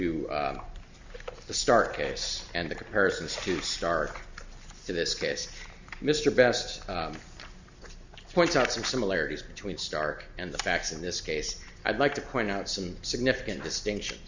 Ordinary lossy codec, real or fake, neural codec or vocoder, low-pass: Opus, 64 kbps; real; none; 7.2 kHz